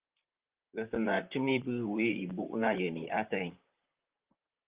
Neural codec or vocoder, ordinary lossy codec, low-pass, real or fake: vocoder, 44.1 kHz, 128 mel bands, Pupu-Vocoder; Opus, 32 kbps; 3.6 kHz; fake